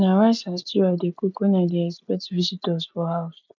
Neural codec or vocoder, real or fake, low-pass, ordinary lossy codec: none; real; 7.2 kHz; none